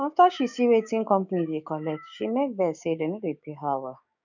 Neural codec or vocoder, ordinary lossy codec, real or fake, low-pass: codec, 16 kHz, 6 kbps, DAC; none; fake; 7.2 kHz